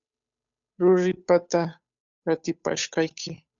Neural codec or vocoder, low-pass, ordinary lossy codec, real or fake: codec, 16 kHz, 8 kbps, FunCodec, trained on Chinese and English, 25 frames a second; 7.2 kHz; MP3, 96 kbps; fake